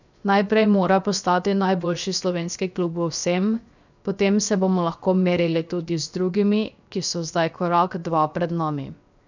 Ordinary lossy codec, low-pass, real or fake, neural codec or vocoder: none; 7.2 kHz; fake; codec, 16 kHz, 0.7 kbps, FocalCodec